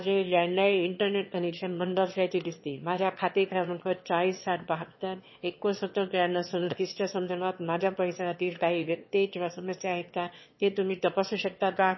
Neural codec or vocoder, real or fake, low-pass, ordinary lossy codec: autoencoder, 22.05 kHz, a latent of 192 numbers a frame, VITS, trained on one speaker; fake; 7.2 kHz; MP3, 24 kbps